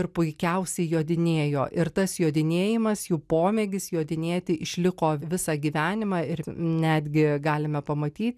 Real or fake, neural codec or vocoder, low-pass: real; none; 14.4 kHz